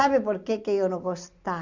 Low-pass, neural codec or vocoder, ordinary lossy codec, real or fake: 7.2 kHz; none; Opus, 64 kbps; real